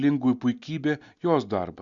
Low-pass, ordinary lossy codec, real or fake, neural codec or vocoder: 7.2 kHz; Opus, 64 kbps; real; none